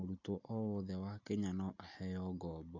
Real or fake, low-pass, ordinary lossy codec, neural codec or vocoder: real; 7.2 kHz; AAC, 48 kbps; none